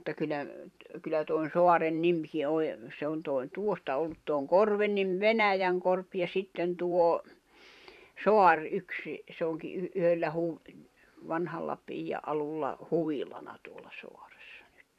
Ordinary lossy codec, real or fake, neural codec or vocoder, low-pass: none; fake; vocoder, 44.1 kHz, 128 mel bands every 512 samples, BigVGAN v2; 14.4 kHz